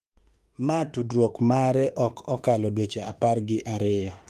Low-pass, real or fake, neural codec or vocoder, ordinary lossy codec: 14.4 kHz; fake; autoencoder, 48 kHz, 32 numbers a frame, DAC-VAE, trained on Japanese speech; Opus, 32 kbps